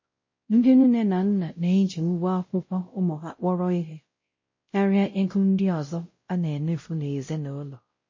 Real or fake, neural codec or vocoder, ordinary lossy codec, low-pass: fake; codec, 16 kHz, 0.5 kbps, X-Codec, WavLM features, trained on Multilingual LibriSpeech; MP3, 32 kbps; 7.2 kHz